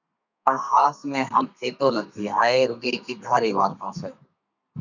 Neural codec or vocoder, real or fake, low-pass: codec, 32 kHz, 1.9 kbps, SNAC; fake; 7.2 kHz